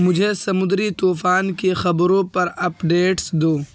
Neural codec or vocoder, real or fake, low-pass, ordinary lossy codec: none; real; none; none